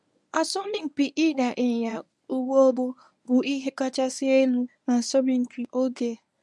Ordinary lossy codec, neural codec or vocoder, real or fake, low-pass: none; codec, 24 kHz, 0.9 kbps, WavTokenizer, medium speech release version 1; fake; none